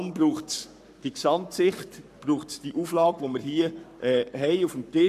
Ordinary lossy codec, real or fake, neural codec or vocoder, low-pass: none; fake; codec, 44.1 kHz, 7.8 kbps, Pupu-Codec; 14.4 kHz